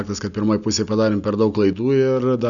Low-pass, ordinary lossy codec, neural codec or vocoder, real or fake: 7.2 kHz; MP3, 96 kbps; none; real